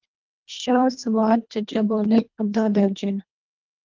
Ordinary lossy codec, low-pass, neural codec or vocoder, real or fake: Opus, 24 kbps; 7.2 kHz; codec, 24 kHz, 1.5 kbps, HILCodec; fake